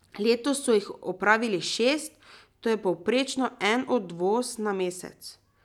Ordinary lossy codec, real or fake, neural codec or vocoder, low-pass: none; real; none; 19.8 kHz